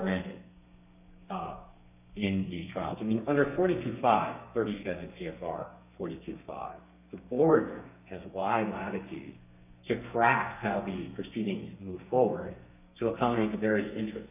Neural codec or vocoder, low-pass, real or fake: codec, 32 kHz, 1.9 kbps, SNAC; 3.6 kHz; fake